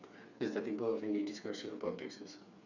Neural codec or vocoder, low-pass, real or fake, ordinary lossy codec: codec, 16 kHz, 4 kbps, FreqCodec, smaller model; 7.2 kHz; fake; none